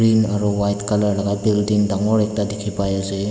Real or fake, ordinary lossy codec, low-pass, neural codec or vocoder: real; none; none; none